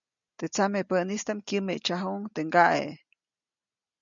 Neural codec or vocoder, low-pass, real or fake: none; 7.2 kHz; real